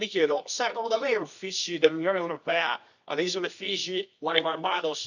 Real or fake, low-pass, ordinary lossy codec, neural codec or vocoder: fake; 7.2 kHz; none; codec, 24 kHz, 0.9 kbps, WavTokenizer, medium music audio release